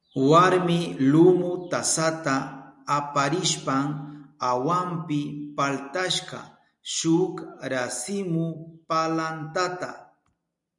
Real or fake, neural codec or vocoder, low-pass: real; none; 10.8 kHz